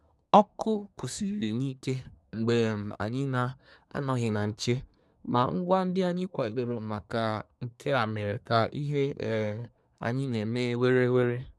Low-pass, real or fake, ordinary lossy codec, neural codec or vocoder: none; fake; none; codec, 24 kHz, 1 kbps, SNAC